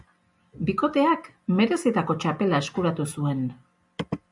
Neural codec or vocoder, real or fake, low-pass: none; real; 10.8 kHz